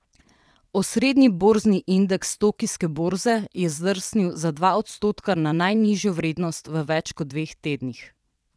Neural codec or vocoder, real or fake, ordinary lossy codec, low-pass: vocoder, 22.05 kHz, 80 mel bands, WaveNeXt; fake; none; none